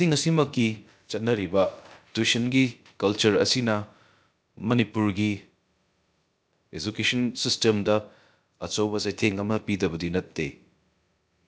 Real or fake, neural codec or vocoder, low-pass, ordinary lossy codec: fake; codec, 16 kHz, about 1 kbps, DyCAST, with the encoder's durations; none; none